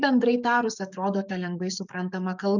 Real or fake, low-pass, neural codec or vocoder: fake; 7.2 kHz; codec, 44.1 kHz, 7.8 kbps, DAC